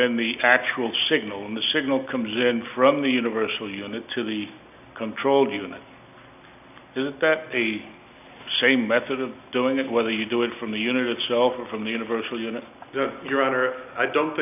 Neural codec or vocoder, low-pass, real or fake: none; 3.6 kHz; real